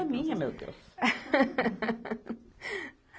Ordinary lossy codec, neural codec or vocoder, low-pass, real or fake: none; none; none; real